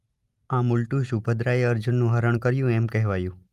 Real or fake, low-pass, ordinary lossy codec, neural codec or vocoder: real; 14.4 kHz; Opus, 32 kbps; none